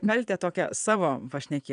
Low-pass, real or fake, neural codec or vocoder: 9.9 kHz; fake; vocoder, 22.05 kHz, 80 mel bands, Vocos